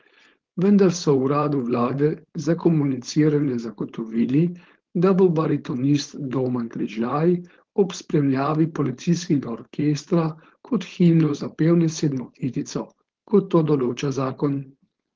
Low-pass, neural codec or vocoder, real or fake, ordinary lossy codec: 7.2 kHz; codec, 16 kHz, 4.8 kbps, FACodec; fake; Opus, 16 kbps